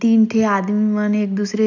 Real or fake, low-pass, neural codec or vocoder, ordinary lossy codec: real; 7.2 kHz; none; none